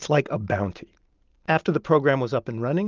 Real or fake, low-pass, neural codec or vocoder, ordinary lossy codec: real; 7.2 kHz; none; Opus, 32 kbps